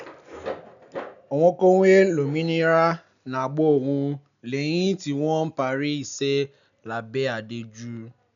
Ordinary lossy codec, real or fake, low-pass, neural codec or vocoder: none; real; 7.2 kHz; none